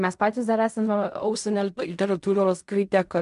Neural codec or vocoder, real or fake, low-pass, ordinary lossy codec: codec, 16 kHz in and 24 kHz out, 0.4 kbps, LongCat-Audio-Codec, fine tuned four codebook decoder; fake; 10.8 kHz; MP3, 64 kbps